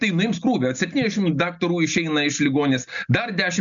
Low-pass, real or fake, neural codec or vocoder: 7.2 kHz; real; none